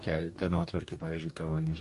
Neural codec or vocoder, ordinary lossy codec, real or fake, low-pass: codec, 44.1 kHz, 2.6 kbps, DAC; MP3, 48 kbps; fake; 14.4 kHz